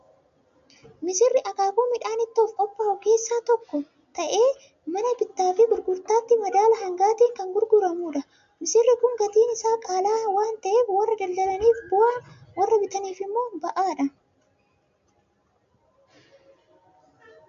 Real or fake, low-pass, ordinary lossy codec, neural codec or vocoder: real; 7.2 kHz; MP3, 48 kbps; none